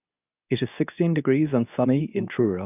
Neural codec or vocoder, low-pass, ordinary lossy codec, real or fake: codec, 24 kHz, 0.9 kbps, WavTokenizer, medium speech release version 2; 3.6 kHz; none; fake